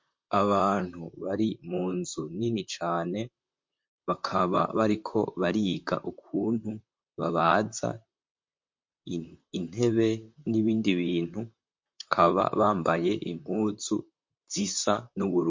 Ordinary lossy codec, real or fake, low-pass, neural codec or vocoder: MP3, 48 kbps; fake; 7.2 kHz; vocoder, 44.1 kHz, 128 mel bands, Pupu-Vocoder